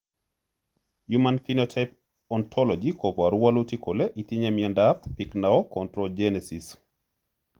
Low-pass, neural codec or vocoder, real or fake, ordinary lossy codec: 19.8 kHz; none; real; Opus, 24 kbps